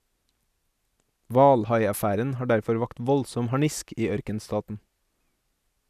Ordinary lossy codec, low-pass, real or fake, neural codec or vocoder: none; 14.4 kHz; real; none